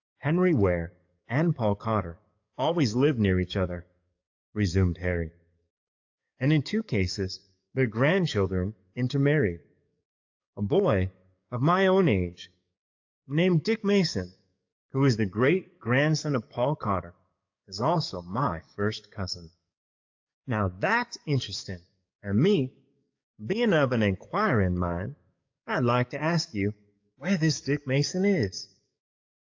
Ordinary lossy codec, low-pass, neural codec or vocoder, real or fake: AAC, 48 kbps; 7.2 kHz; codec, 44.1 kHz, 7.8 kbps, DAC; fake